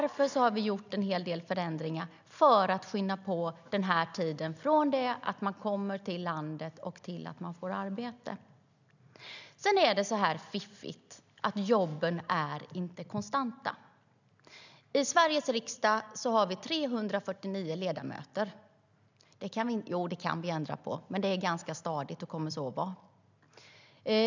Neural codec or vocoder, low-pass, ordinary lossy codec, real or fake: none; 7.2 kHz; none; real